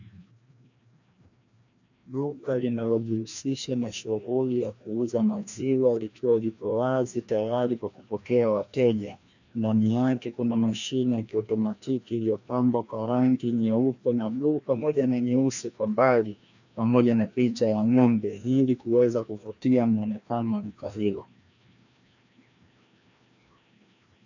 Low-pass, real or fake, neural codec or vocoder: 7.2 kHz; fake; codec, 16 kHz, 1 kbps, FreqCodec, larger model